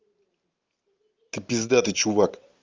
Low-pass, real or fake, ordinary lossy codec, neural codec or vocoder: 7.2 kHz; real; Opus, 32 kbps; none